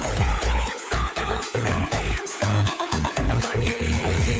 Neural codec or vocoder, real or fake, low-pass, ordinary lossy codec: codec, 16 kHz, 4 kbps, FunCodec, trained on LibriTTS, 50 frames a second; fake; none; none